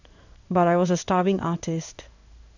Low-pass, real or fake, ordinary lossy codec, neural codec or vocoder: 7.2 kHz; fake; none; codec, 16 kHz in and 24 kHz out, 1 kbps, XY-Tokenizer